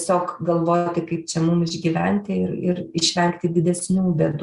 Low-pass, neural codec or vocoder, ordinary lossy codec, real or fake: 10.8 kHz; none; Opus, 64 kbps; real